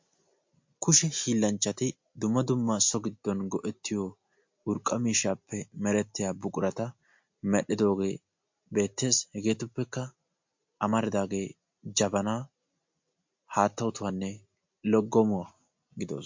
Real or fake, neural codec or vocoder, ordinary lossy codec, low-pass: real; none; MP3, 48 kbps; 7.2 kHz